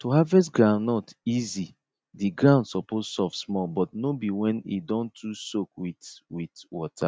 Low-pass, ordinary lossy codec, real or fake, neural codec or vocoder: none; none; real; none